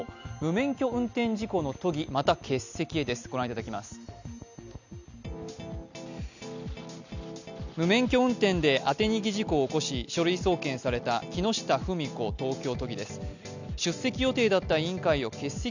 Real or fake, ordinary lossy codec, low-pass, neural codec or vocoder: real; none; 7.2 kHz; none